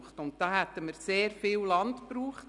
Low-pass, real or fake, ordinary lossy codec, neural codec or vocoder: 10.8 kHz; real; none; none